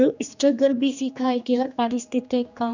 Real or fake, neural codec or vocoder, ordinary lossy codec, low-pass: fake; codec, 16 kHz, 2 kbps, X-Codec, HuBERT features, trained on general audio; none; 7.2 kHz